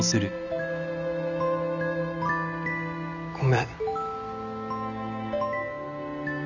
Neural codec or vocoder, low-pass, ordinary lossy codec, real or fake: none; 7.2 kHz; none; real